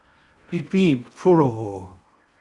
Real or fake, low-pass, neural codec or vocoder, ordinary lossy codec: fake; 10.8 kHz; codec, 16 kHz in and 24 kHz out, 0.6 kbps, FocalCodec, streaming, 4096 codes; Opus, 64 kbps